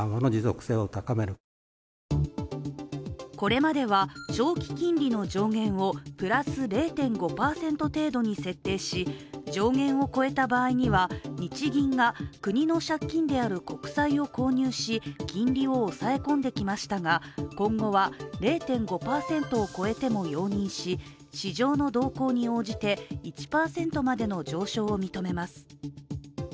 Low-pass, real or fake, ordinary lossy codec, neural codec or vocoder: none; real; none; none